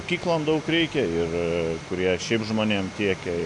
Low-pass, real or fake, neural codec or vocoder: 10.8 kHz; real; none